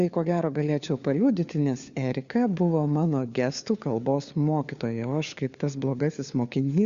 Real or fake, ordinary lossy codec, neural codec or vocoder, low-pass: fake; Opus, 64 kbps; codec, 16 kHz, 4 kbps, FunCodec, trained on LibriTTS, 50 frames a second; 7.2 kHz